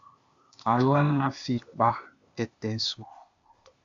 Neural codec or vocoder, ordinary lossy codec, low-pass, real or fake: codec, 16 kHz, 0.8 kbps, ZipCodec; AAC, 64 kbps; 7.2 kHz; fake